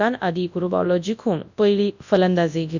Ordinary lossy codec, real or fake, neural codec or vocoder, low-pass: none; fake; codec, 24 kHz, 0.9 kbps, WavTokenizer, large speech release; 7.2 kHz